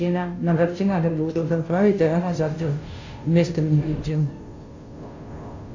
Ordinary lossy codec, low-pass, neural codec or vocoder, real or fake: AAC, 48 kbps; 7.2 kHz; codec, 16 kHz, 0.5 kbps, FunCodec, trained on Chinese and English, 25 frames a second; fake